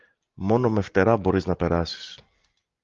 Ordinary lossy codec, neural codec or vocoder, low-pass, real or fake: Opus, 32 kbps; none; 7.2 kHz; real